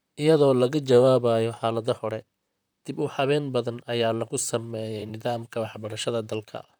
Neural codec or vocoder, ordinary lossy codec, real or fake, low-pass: vocoder, 44.1 kHz, 128 mel bands, Pupu-Vocoder; none; fake; none